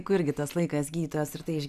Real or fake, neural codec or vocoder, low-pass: real; none; 14.4 kHz